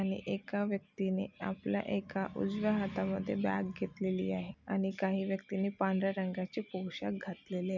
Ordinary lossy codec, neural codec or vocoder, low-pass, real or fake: none; none; 7.2 kHz; real